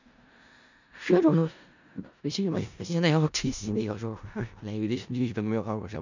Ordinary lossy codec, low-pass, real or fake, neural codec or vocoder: none; 7.2 kHz; fake; codec, 16 kHz in and 24 kHz out, 0.4 kbps, LongCat-Audio-Codec, four codebook decoder